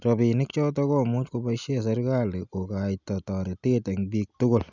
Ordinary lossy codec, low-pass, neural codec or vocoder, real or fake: none; 7.2 kHz; none; real